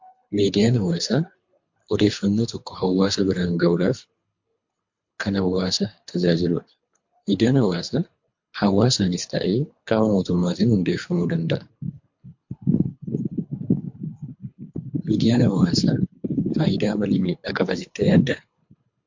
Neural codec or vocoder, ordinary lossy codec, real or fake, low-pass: codec, 24 kHz, 3 kbps, HILCodec; MP3, 48 kbps; fake; 7.2 kHz